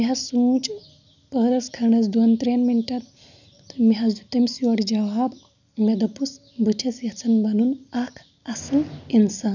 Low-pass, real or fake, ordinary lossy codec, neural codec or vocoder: 7.2 kHz; real; none; none